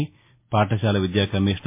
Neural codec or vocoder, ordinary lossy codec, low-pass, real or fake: none; MP3, 24 kbps; 3.6 kHz; real